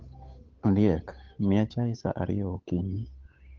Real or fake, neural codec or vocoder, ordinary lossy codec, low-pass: fake; codec, 16 kHz, 8 kbps, FunCodec, trained on Chinese and English, 25 frames a second; Opus, 32 kbps; 7.2 kHz